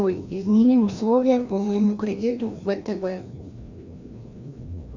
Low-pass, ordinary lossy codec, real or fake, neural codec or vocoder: 7.2 kHz; Opus, 64 kbps; fake; codec, 16 kHz, 1 kbps, FreqCodec, larger model